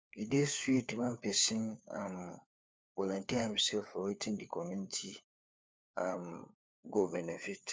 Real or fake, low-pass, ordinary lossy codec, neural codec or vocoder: fake; none; none; codec, 16 kHz, 4 kbps, FunCodec, trained on LibriTTS, 50 frames a second